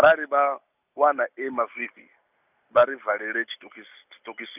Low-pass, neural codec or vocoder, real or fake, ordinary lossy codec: 3.6 kHz; none; real; none